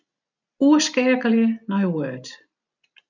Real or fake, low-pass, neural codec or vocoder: real; 7.2 kHz; none